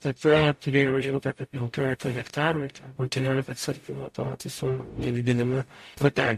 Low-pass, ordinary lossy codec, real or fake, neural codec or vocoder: 14.4 kHz; MP3, 64 kbps; fake; codec, 44.1 kHz, 0.9 kbps, DAC